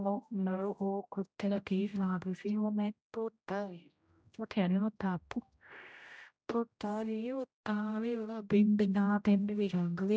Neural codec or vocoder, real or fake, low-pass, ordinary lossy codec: codec, 16 kHz, 0.5 kbps, X-Codec, HuBERT features, trained on general audio; fake; none; none